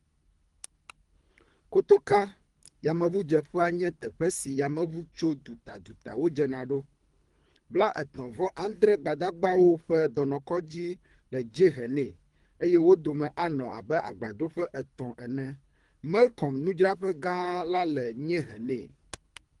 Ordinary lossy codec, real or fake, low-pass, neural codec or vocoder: Opus, 24 kbps; fake; 10.8 kHz; codec, 24 kHz, 3 kbps, HILCodec